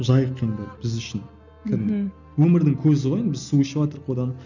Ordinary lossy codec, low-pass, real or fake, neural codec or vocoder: none; 7.2 kHz; real; none